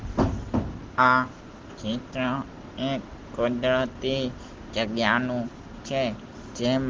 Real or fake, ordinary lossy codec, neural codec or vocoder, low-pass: real; Opus, 16 kbps; none; 7.2 kHz